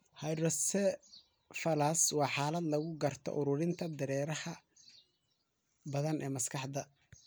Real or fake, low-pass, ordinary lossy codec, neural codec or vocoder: real; none; none; none